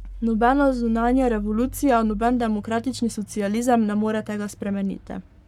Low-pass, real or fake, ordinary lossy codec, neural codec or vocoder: 19.8 kHz; fake; none; codec, 44.1 kHz, 7.8 kbps, Pupu-Codec